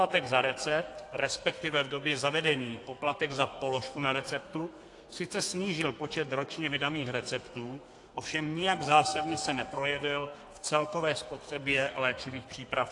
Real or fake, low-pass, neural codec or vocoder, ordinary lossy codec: fake; 10.8 kHz; codec, 32 kHz, 1.9 kbps, SNAC; AAC, 48 kbps